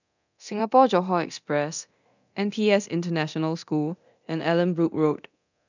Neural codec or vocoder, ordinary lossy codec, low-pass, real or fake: codec, 24 kHz, 0.9 kbps, DualCodec; none; 7.2 kHz; fake